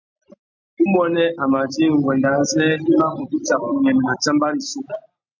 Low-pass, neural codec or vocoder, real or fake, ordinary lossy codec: 7.2 kHz; none; real; MP3, 64 kbps